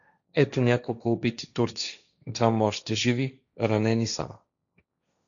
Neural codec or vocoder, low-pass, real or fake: codec, 16 kHz, 1.1 kbps, Voila-Tokenizer; 7.2 kHz; fake